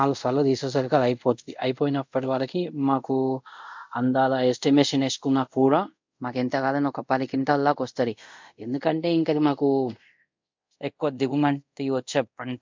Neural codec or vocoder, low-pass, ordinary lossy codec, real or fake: codec, 24 kHz, 0.5 kbps, DualCodec; 7.2 kHz; MP3, 64 kbps; fake